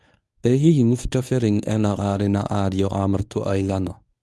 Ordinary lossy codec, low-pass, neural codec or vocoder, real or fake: none; none; codec, 24 kHz, 0.9 kbps, WavTokenizer, medium speech release version 1; fake